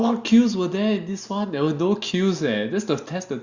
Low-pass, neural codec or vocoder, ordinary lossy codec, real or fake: 7.2 kHz; none; Opus, 64 kbps; real